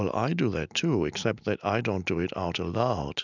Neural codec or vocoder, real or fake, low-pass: none; real; 7.2 kHz